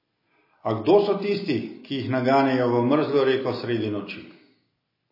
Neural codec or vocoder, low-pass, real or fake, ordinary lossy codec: none; 5.4 kHz; real; MP3, 24 kbps